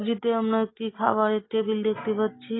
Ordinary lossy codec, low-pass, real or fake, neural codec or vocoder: AAC, 16 kbps; 7.2 kHz; real; none